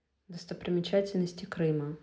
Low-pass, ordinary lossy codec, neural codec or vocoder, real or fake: none; none; none; real